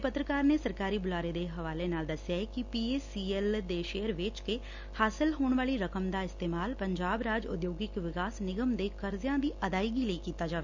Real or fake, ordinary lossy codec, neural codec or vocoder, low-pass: real; none; none; 7.2 kHz